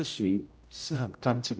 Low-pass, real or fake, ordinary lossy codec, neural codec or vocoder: none; fake; none; codec, 16 kHz, 0.5 kbps, X-Codec, HuBERT features, trained on general audio